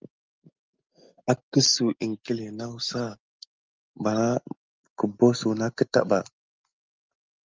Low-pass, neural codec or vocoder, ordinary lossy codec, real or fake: 7.2 kHz; none; Opus, 24 kbps; real